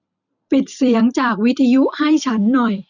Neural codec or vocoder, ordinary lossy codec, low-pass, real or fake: vocoder, 44.1 kHz, 128 mel bands every 256 samples, BigVGAN v2; none; 7.2 kHz; fake